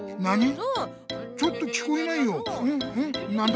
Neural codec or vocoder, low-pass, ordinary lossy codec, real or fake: none; none; none; real